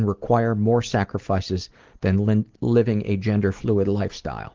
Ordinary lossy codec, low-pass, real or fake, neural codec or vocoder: Opus, 16 kbps; 7.2 kHz; real; none